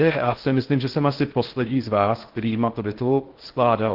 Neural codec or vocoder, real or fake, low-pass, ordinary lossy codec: codec, 16 kHz in and 24 kHz out, 0.6 kbps, FocalCodec, streaming, 4096 codes; fake; 5.4 kHz; Opus, 16 kbps